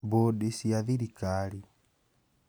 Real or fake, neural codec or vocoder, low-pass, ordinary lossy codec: real; none; none; none